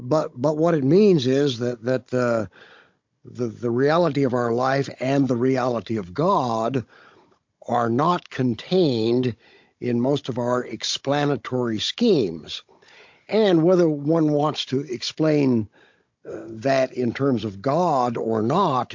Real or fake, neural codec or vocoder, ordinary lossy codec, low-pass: fake; codec, 16 kHz, 16 kbps, FunCodec, trained on Chinese and English, 50 frames a second; MP3, 48 kbps; 7.2 kHz